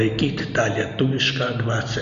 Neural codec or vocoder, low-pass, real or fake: none; 7.2 kHz; real